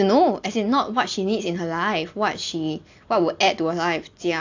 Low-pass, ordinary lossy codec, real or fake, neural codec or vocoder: 7.2 kHz; AAC, 48 kbps; real; none